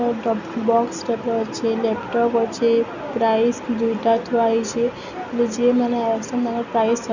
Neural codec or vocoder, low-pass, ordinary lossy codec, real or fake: none; 7.2 kHz; none; real